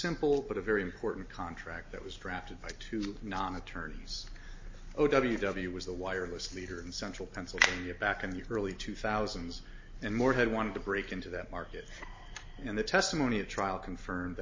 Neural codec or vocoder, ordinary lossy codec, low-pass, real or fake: none; MP3, 32 kbps; 7.2 kHz; real